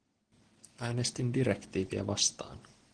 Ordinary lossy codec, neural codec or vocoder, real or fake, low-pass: Opus, 16 kbps; none; real; 9.9 kHz